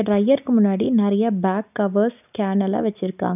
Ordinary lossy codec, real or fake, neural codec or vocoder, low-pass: none; real; none; 3.6 kHz